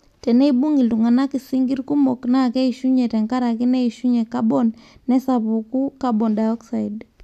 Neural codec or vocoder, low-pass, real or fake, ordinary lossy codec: none; 14.4 kHz; real; none